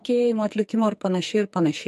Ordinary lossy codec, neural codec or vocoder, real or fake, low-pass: MP3, 64 kbps; codec, 44.1 kHz, 2.6 kbps, SNAC; fake; 14.4 kHz